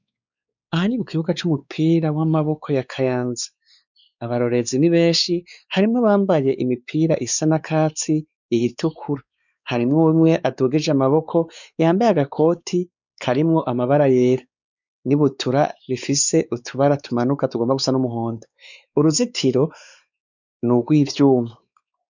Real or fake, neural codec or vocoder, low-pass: fake; codec, 16 kHz, 4 kbps, X-Codec, WavLM features, trained on Multilingual LibriSpeech; 7.2 kHz